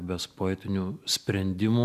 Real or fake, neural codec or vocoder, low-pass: real; none; 14.4 kHz